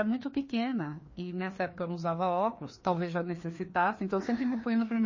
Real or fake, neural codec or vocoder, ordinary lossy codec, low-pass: fake; codec, 16 kHz, 2 kbps, FreqCodec, larger model; MP3, 32 kbps; 7.2 kHz